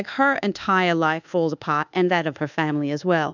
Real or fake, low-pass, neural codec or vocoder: fake; 7.2 kHz; codec, 24 kHz, 1.2 kbps, DualCodec